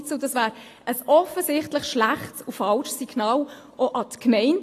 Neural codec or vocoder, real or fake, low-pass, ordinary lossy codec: none; real; 14.4 kHz; AAC, 48 kbps